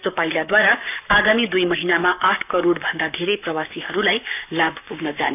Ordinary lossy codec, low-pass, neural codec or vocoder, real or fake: none; 3.6 kHz; codec, 44.1 kHz, 7.8 kbps, Pupu-Codec; fake